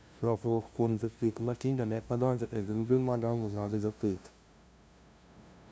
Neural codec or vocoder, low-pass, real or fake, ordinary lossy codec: codec, 16 kHz, 0.5 kbps, FunCodec, trained on LibriTTS, 25 frames a second; none; fake; none